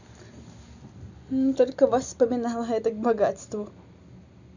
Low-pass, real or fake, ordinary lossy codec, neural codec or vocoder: 7.2 kHz; real; none; none